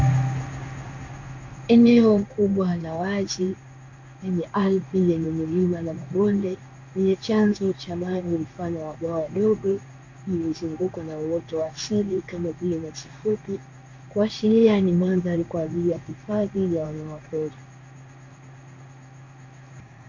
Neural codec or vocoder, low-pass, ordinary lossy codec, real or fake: codec, 16 kHz in and 24 kHz out, 1 kbps, XY-Tokenizer; 7.2 kHz; MP3, 64 kbps; fake